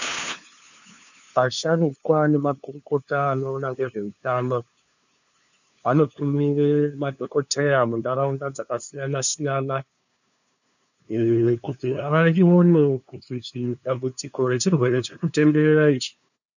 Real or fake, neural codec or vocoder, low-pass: fake; codec, 16 kHz, 2 kbps, FunCodec, trained on LibriTTS, 25 frames a second; 7.2 kHz